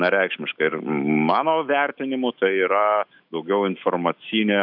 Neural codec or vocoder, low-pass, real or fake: autoencoder, 48 kHz, 128 numbers a frame, DAC-VAE, trained on Japanese speech; 5.4 kHz; fake